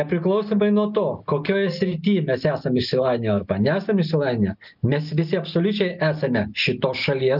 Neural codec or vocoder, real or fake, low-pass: none; real; 5.4 kHz